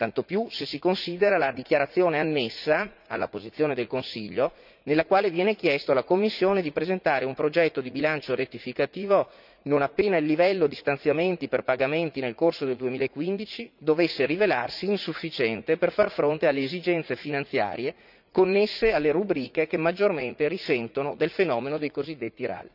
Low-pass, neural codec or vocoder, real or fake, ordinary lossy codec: 5.4 kHz; vocoder, 44.1 kHz, 80 mel bands, Vocos; fake; none